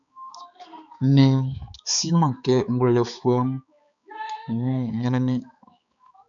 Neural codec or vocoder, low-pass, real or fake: codec, 16 kHz, 4 kbps, X-Codec, HuBERT features, trained on balanced general audio; 7.2 kHz; fake